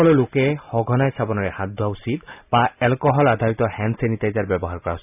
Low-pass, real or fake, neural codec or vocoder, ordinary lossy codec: 3.6 kHz; real; none; none